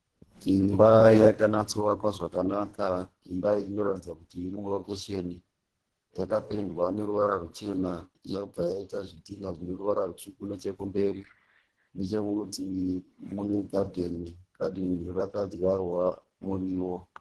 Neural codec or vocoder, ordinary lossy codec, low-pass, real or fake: codec, 24 kHz, 1.5 kbps, HILCodec; Opus, 16 kbps; 10.8 kHz; fake